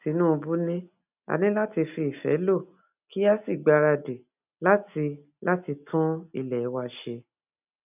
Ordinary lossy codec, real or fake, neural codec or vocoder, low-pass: none; real; none; 3.6 kHz